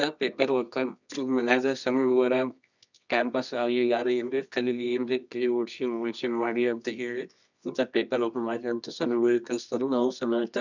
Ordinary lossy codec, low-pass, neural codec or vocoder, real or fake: none; 7.2 kHz; codec, 24 kHz, 0.9 kbps, WavTokenizer, medium music audio release; fake